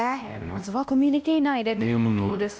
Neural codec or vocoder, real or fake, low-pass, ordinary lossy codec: codec, 16 kHz, 1 kbps, X-Codec, WavLM features, trained on Multilingual LibriSpeech; fake; none; none